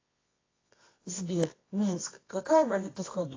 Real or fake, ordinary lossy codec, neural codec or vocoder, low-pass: fake; AAC, 32 kbps; codec, 24 kHz, 0.9 kbps, WavTokenizer, medium music audio release; 7.2 kHz